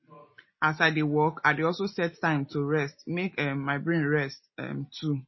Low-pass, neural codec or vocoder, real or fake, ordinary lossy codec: 7.2 kHz; none; real; MP3, 24 kbps